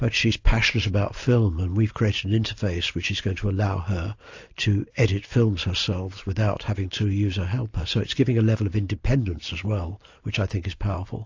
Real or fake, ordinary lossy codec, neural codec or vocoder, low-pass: real; AAC, 48 kbps; none; 7.2 kHz